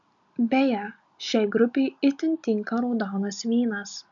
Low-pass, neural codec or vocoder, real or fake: 7.2 kHz; none; real